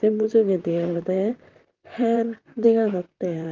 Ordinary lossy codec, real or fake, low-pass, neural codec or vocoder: Opus, 24 kbps; fake; 7.2 kHz; vocoder, 44.1 kHz, 128 mel bands, Pupu-Vocoder